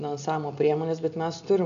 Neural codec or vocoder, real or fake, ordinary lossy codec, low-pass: none; real; MP3, 96 kbps; 7.2 kHz